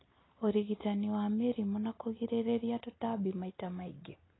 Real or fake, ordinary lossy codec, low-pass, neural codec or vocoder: real; AAC, 16 kbps; 7.2 kHz; none